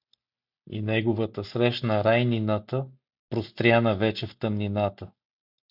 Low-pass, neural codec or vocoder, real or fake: 5.4 kHz; none; real